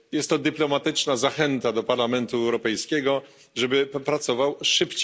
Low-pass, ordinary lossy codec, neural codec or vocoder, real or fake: none; none; none; real